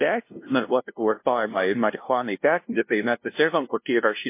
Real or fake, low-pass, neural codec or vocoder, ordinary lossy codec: fake; 3.6 kHz; codec, 16 kHz, 0.5 kbps, FunCodec, trained on LibriTTS, 25 frames a second; MP3, 24 kbps